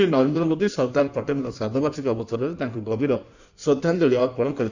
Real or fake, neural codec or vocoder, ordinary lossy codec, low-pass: fake; codec, 16 kHz in and 24 kHz out, 1.1 kbps, FireRedTTS-2 codec; none; 7.2 kHz